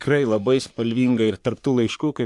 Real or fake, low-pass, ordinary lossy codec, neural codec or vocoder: fake; 10.8 kHz; MP3, 64 kbps; codec, 44.1 kHz, 3.4 kbps, Pupu-Codec